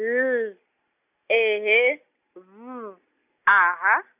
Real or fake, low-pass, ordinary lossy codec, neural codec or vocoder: real; 3.6 kHz; none; none